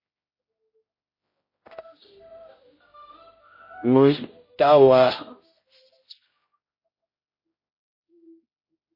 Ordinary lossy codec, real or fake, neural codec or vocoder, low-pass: MP3, 24 kbps; fake; codec, 16 kHz, 0.5 kbps, X-Codec, HuBERT features, trained on general audio; 5.4 kHz